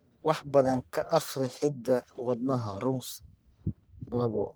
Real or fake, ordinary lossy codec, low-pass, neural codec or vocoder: fake; none; none; codec, 44.1 kHz, 1.7 kbps, Pupu-Codec